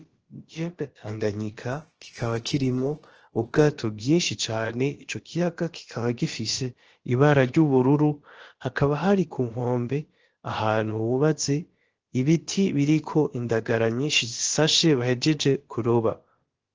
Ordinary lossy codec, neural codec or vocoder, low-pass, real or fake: Opus, 16 kbps; codec, 16 kHz, about 1 kbps, DyCAST, with the encoder's durations; 7.2 kHz; fake